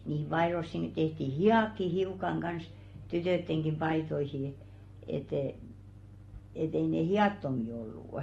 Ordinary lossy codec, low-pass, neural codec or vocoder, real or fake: AAC, 32 kbps; 19.8 kHz; none; real